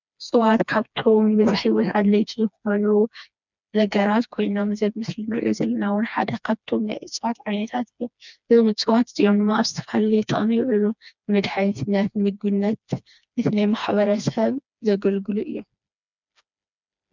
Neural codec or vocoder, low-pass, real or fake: codec, 16 kHz, 2 kbps, FreqCodec, smaller model; 7.2 kHz; fake